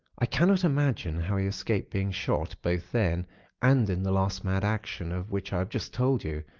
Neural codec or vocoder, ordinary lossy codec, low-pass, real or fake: none; Opus, 32 kbps; 7.2 kHz; real